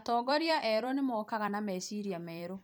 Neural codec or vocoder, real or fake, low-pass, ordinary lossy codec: vocoder, 44.1 kHz, 128 mel bands every 256 samples, BigVGAN v2; fake; none; none